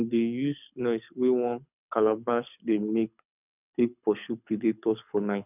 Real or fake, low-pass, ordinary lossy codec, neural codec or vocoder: fake; 3.6 kHz; none; codec, 24 kHz, 6 kbps, HILCodec